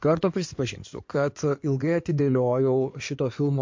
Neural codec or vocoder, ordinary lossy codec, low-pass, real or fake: codec, 16 kHz in and 24 kHz out, 2.2 kbps, FireRedTTS-2 codec; MP3, 48 kbps; 7.2 kHz; fake